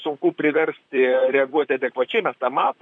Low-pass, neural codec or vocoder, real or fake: 9.9 kHz; vocoder, 44.1 kHz, 128 mel bands, Pupu-Vocoder; fake